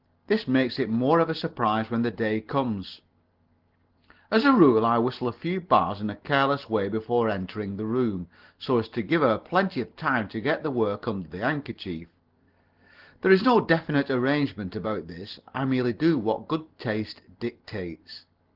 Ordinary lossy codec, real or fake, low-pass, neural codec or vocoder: Opus, 16 kbps; real; 5.4 kHz; none